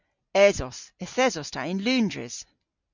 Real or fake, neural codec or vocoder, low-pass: real; none; 7.2 kHz